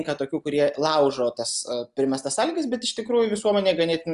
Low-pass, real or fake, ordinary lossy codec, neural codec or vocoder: 10.8 kHz; real; MP3, 96 kbps; none